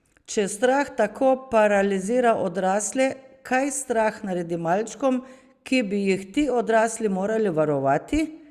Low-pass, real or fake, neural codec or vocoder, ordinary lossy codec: 14.4 kHz; real; none; Opus, 64 kbps